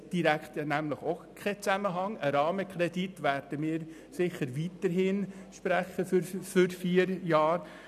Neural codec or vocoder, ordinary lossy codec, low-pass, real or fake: none; none; 14.4 kHz; real